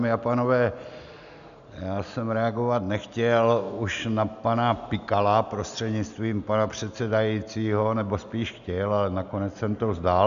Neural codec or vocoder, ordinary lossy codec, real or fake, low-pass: none; MP3, 96 kbps; real; 7.2 kHz